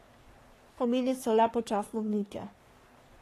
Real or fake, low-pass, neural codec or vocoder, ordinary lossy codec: fake; 14.4 kHz; codec, 44.1 kHz, 3.4 kbps, Pupu-Codec; AAC, 48 kbps